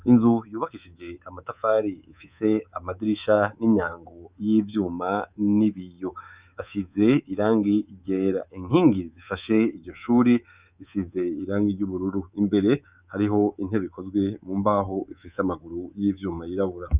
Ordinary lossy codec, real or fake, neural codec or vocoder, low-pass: Opus, 64 kbps; fake; codec, 24 kHz, 3.1 kbps, DualCodec; 3.6 kHz